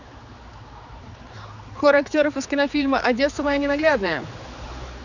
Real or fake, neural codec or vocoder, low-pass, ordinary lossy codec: fake; codec, 16 kHz, 4 kbps, X-Codec, HuBERT features, trained on general audio; 7.2 kHz; none